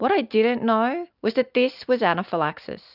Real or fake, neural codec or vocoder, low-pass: real; none; 5.4 kHz